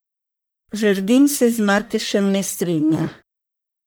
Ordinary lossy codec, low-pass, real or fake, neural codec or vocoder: none; none; fake; codec, 44.1 kHz, 1.7 kbps, Pupu-Codec